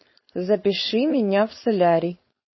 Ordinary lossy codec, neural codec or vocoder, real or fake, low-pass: MP3, 24 kbps; codec, 16 kHz, 4.8 kbps, FACodec; fake; 7.2 kHz